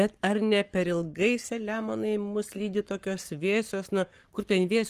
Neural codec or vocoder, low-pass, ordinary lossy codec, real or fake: codec, 44.1 kHz, 7.8 kbps, Pupu-Codec; 14.4 kHz; Opus, 24 kbps; fake